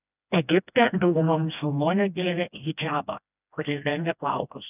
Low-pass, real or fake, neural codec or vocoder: 3.6 kHz; fake; codec, 16 kHz, 1 kbps, FreqCodec, smaller model